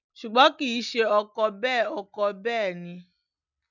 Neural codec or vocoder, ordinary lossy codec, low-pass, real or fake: none; none; 7.2 kHz; real